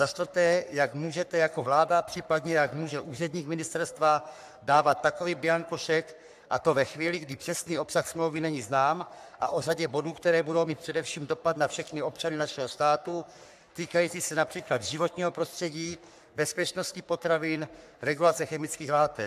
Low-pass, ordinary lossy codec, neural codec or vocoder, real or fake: 14.4 kHz; AAC, 96 kbps; codec, 44.1 kHz, 3.4 kbps, Pupu-Codec; fake